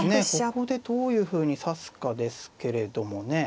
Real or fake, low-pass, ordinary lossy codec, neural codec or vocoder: real; none; none; none